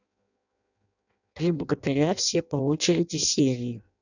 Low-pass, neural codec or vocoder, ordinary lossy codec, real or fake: 7.2 kHz; codec, 16 kHz in and 24 kHz out, 0.6 kbps, FireRedTTS-2 codec; none; fake